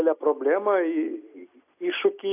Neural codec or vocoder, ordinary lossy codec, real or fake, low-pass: none; AAC, 32 kbps; real; 3.6 kHz